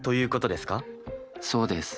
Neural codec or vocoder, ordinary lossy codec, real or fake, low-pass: none; none; real; none